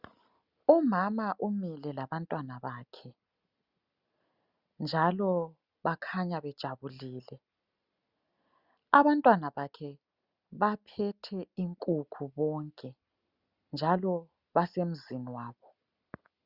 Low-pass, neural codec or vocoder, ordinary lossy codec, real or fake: 5.4 kHz; none; Opus, 64 kbps; real